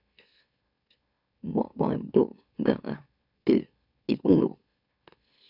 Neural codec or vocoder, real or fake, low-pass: autoencoder, 44.1 kHz, a latent of 192 numbers a frame, MeloTTS; fake; 5.4 kHz